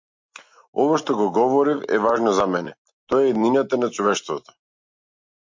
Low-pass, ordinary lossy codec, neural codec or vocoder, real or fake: 7.2 kHz; MP3, 48 kbps; none; real